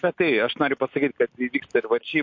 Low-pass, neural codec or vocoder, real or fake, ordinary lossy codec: 7.2 kHz; none; real; MP3, 48 kbps